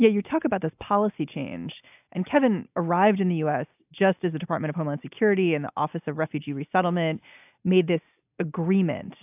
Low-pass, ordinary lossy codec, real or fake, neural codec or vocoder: 3.6 kHz; AAC, 32 kbps; real; none